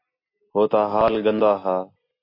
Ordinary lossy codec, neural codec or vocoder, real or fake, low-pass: MP3, 32 kbps; none; real; 5.4 kHz